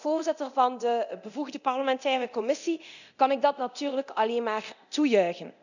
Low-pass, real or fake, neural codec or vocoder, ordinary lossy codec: 7.2 kHz; fake; codec, 24 kHz, 0.9 kbps, DualCodec; none